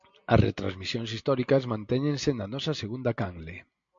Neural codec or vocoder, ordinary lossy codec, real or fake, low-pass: none; MP3, 48 kbps; real; 7.2 kHz